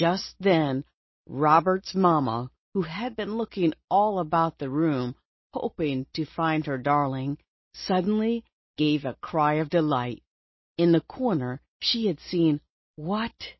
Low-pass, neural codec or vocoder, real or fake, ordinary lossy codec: 7.2 kHz; none; real; MP3, 24 kbps